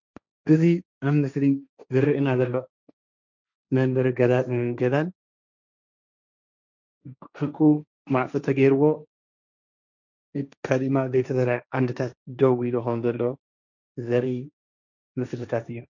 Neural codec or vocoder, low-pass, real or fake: codec, 16 kHz, 1.1 kbps, Voila-Tokenizer; 7.2 kHz; fake